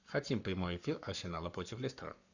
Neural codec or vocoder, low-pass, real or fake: codec, 44.1 kHz, 7.8 kbps, Pupu-Codec; 7.2 kHz; fake